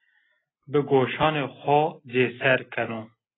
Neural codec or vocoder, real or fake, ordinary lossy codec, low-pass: none; real; AAC, 16 kbps; 7.2 kHz